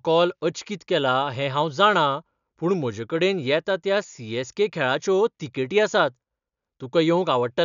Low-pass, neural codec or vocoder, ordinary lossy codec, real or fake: 7.2 kHz; none; none; real